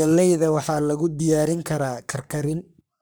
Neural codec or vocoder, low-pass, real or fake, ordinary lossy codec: codec, 44.1 kHz, 3.4 kbps, Pupu-Codec; none; fake; none